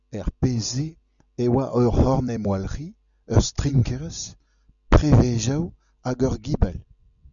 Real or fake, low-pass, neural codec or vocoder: real; 7.2 kHz; none